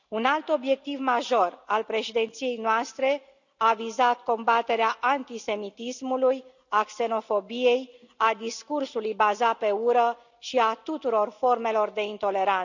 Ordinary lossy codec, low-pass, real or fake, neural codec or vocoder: none; 7.2 kHz; real; none